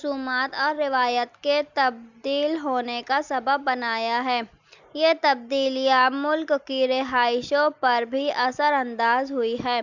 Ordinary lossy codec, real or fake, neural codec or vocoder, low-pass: none; real; none; 7.2 kHz